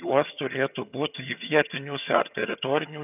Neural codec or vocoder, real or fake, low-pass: vocoder, 22.05 kHz, 80 mel bands, HiFi-GAN; fake; 3.6 kHz